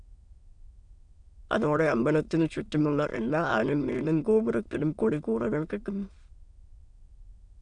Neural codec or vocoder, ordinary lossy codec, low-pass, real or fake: autoencoder, 22.05 kHz, a latent of 192 numbers a frame, VITS, trained on many speakers; Opus, 64 kbps; 9.9 kHz; fake